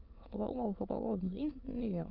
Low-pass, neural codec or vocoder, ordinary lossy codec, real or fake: 5.4 kHz; autoencoder, 22.05 kHz, a latent of 192 numbers a frame, VITS, trained on many speakers; Opus, 32 kbps; fake